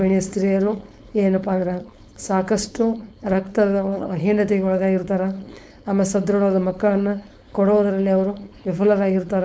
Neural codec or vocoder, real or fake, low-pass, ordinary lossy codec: codec, 16 kHz, 4.8 kbps, FACodec; fake; none; none